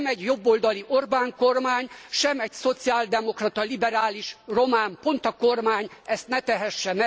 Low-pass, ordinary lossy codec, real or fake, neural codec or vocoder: none; none; real; none